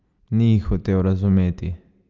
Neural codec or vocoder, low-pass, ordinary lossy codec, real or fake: none; 7.2 kHz; Opus, 32 kbps; real